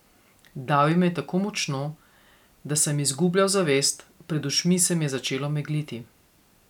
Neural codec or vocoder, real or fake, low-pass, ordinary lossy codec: none; real; 19.8 kHz; none